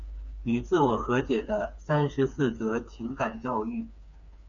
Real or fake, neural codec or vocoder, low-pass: fake; codec, 16 kHz, 4 kbps, FreqCodec, smaller model; 7.2 kHz